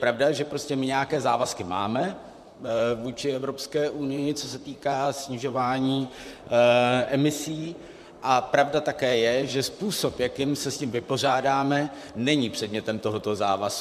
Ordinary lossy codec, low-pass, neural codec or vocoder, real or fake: MP3, 96 kbps; 14.4 kHz; vocoder, 44.1 kHz, 128 mel bands, Pupu-Vocoder; fake